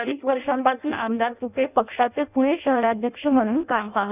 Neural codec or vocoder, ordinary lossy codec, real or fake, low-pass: codec, 16 kHz in and 24 kHz out, 0.6 kbps, FireRedTTS-2 codec; none; fake; 3.6 kHz